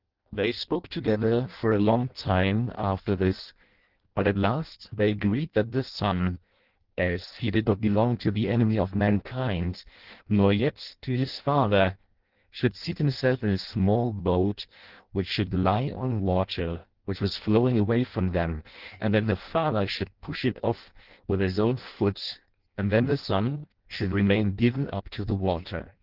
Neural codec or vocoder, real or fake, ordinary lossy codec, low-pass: codec, 16 kHz in and 24 kHz out, 0.6 kbps, FireRedTTS-2 codec; fake; Opus, 16 kbps; 5.4 kHz